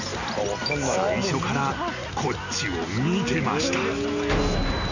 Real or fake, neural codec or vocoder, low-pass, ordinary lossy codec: real; none; 7.2 kHz; none